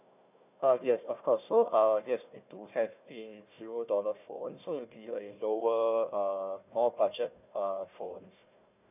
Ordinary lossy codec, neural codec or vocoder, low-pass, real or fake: none; codec, 16 kHz, 1 kbps, FunCodec, trained on Chinese and English, 50 frames a second; 3.6 kHz; fake